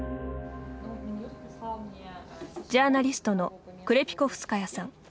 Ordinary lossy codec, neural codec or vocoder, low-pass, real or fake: none; none; none; real